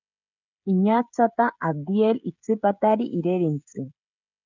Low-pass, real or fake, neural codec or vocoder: 7.2 kHz; fake; codec, 16 kHz, 16 kbps, FreqCodec, smaller model